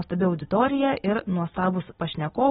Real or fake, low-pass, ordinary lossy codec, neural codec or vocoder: real; 9.9 kHz; AAC, 16 kbps; none